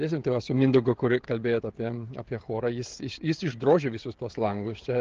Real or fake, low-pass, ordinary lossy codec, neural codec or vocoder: fake; 7.2 kHz; Opus, 16 kbps; codec, 16 kHz, 16 kbps, FreqCodec, smaller model